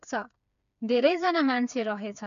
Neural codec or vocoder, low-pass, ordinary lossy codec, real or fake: codec, 16 kHz, 4 kbps, FreqCodec, smaller model; 7.2 kHz; none; fake